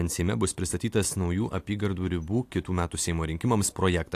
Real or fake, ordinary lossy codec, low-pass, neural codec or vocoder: real; AAC, 64 kbps; 14.4 kHz; none